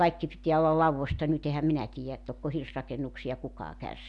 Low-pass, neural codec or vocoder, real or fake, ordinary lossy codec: 10.8 kHz; none; real; none